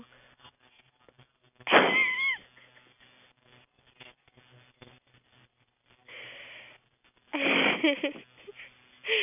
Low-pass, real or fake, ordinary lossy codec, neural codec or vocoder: 3.6 kHz; real; none; none